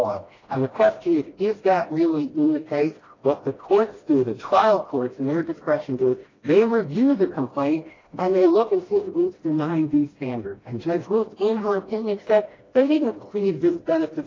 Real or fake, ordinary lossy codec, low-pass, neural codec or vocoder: fake; AAC, 32 kbps; 7.2 kHz; codec, 16 kHz, 1 kbps, FreqCodec, smaller model